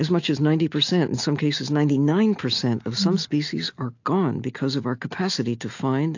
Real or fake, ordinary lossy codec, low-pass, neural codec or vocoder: real; AAC, 48 kbps; 7.2 kHz; none